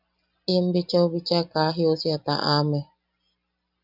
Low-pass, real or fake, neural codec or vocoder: 5.4 kHz; real; none